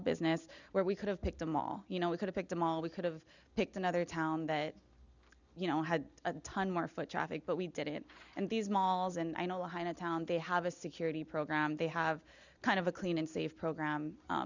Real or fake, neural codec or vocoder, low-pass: real; none; 7.2 kHz